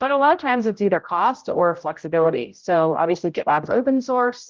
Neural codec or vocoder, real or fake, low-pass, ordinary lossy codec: codec, 16 kHz, 0.5 kbps, X-Codec, HuBERT features, trained on general audio; fake; 7.2 kHz; Opus, 24 kbps